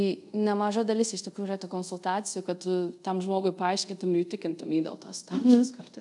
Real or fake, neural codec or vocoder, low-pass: fake; codec, 24 kHz, 0.5 kbps, DualCodec; 10.8 kHz